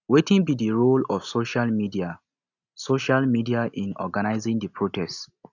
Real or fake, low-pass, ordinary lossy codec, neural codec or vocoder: real; 7.2 kHz; none; none